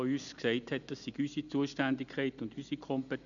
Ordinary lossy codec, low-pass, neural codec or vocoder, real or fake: none; 7.2 kHz; none; real